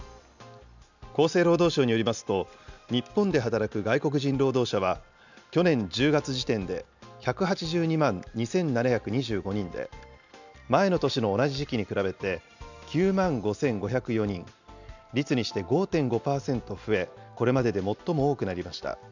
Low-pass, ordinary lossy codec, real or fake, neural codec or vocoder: 7.2 kHz; none; real; none